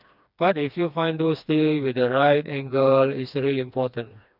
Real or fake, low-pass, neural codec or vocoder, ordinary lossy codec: fake; 5.4 kHz; codec, 16 kHz, 2 kbps, FreqCodec, smaller model; none